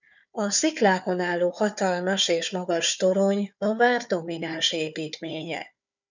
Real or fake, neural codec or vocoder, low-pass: fake; codec, 16 kHz, 4 kbps, FunCodec, trained on Chinese and English, 50 frames a second; 7.2 kHz